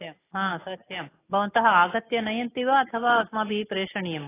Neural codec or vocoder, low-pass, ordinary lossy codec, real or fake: none; 3.6 kHz; AAC, 16 kbps; real